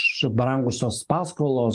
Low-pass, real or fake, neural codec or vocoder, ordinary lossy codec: 10.8 kHz; fake; codec, 44.1 kHz, 7.8 kbps, DAC; Opus, 32 kbps